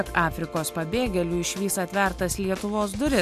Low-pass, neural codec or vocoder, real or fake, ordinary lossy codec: 14.4 kHz; none; real; MP3, 96 kbps